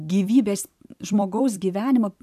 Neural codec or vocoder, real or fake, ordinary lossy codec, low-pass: vocoder, 44.1 kHz, 128 mel bands every 256 samples, BigVGAN v2; fake; AAC, 96 kbps; 14.4 kHz